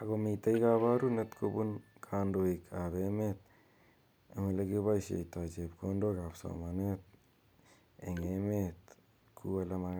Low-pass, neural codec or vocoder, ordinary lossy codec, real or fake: none; none; none; real